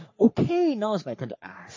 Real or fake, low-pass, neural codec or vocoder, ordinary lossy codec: fake; 7.2 kHz; codec, 44.1 kHz, 3.4 kbps, Pupu-Codec; MP3, 32 kbps